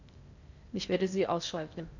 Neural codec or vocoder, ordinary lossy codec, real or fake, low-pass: codec, 16 kHz in and 24 kHz out, 0.6 kbps, FocalCodec, streaming, 2048 codes; none; fake; 7.2 kHz